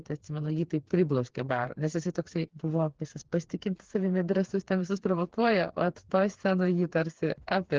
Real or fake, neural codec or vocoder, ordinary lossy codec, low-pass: fake; codec, 16 kHz, 4 kbps, FreqCodec, smaller model; Opus, 16 kbps; 7.2 kHz